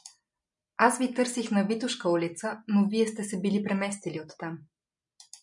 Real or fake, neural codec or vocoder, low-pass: real; none; 10.8 kHz